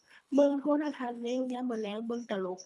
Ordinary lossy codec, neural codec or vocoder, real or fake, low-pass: none; codec, 24 kHz, 3 kbps, HILCodec; fake; none